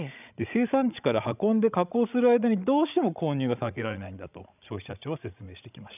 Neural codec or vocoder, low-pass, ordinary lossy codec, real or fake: codec, 16 kHz, 16 kbps, FreqCodec, larger model; 3.6 kHz; none; fake